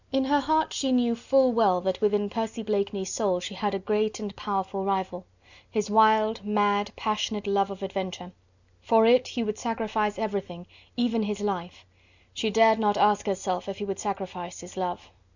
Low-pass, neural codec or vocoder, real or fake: 7.2 kHz; none; real